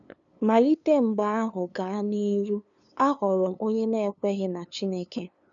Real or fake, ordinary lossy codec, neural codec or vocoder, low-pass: fake; none; codec, 16 kHz, 2 kbps, FunCodec, trained on LibriTTS, 25 frames a second; 7.2 kHz